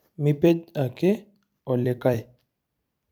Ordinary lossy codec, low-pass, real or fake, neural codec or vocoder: none; none; fake; vocoder, 44.1 kHz, 128 mel bands every 256 samples, BigVGAN v2